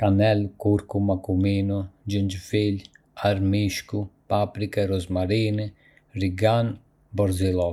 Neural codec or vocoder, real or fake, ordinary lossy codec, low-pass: none; real; none; 19.8 kHz